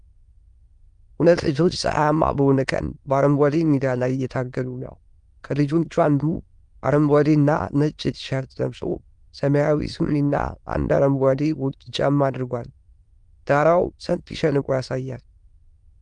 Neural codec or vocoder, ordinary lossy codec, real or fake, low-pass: autoencoder, 22.05 kHz, a latent of 192 numbers a frame, VITS, trained on many speakers; Opus, 32 kbps; fake; 9.9 kHz